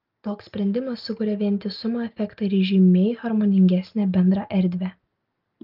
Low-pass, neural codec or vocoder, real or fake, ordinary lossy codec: 5.4 kHz; none; real; Opus, 24 kbps